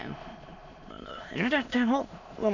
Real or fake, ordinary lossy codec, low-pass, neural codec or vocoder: fake; none; 7.2 kHz; autoencoder, 22.05 kHz, a latent of 192 numbers a frame, VITS, trained on many speakers